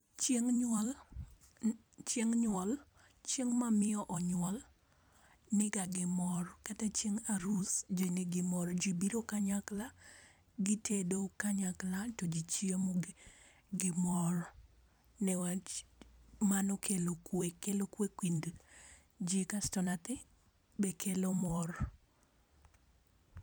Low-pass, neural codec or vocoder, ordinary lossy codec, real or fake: none; vocoder, 44.1 kHz, 128 mel bands every 256 samples, BigVGAN v2; none; fake